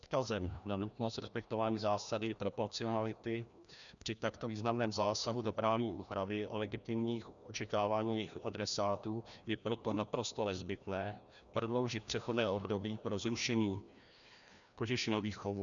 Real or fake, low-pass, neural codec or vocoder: fake; 7.2 kHz; codec, 16 kHz, 1 kbps, FreqCodec, larger model